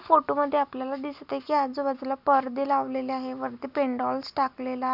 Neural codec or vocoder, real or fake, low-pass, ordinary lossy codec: none; real; 5.4 kHz; none